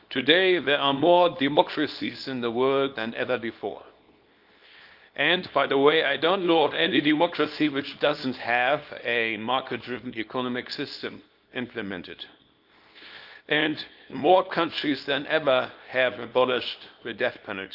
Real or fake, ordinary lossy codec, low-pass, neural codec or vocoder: fake; Opus, 24 kbps; 5.4 kHz; codec, 24 kHz, 0.9 kbps, WavTokenizer, small release